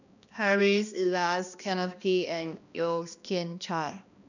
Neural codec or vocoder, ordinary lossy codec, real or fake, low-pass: codec, 16 kHz, 1 kbps, X-Codec, HuBERT features, trained on balanced general audio; none; fake; 7.2 kHz